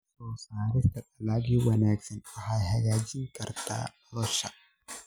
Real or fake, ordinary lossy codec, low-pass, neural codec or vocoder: real; none; none; none